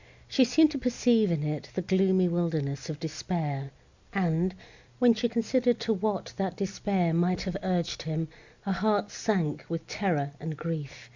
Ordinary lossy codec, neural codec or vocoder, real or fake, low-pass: Opus, 64 kbps; none; real; 7.2 kHz